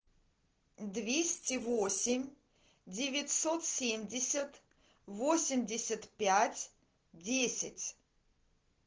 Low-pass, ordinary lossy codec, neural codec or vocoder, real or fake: 7.2 kHz; Opus, 16 kbps; none; real